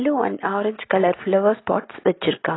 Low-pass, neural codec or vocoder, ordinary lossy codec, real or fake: 7.2 kHz; none; AAC, 16 kbps; real